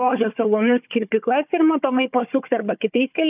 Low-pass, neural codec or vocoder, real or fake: 3.6 kHz; codec, 16 kHz, 4 kbps, FunCodec, trained on Chinese and English, 50 frames a second; fake